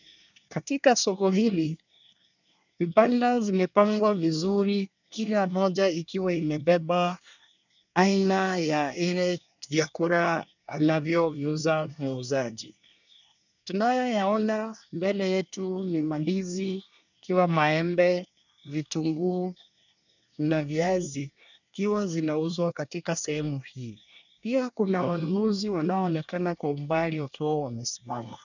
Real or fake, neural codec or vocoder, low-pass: fake; codec, 24 kHz, 1 kbps, SNAC; 7.2 kHz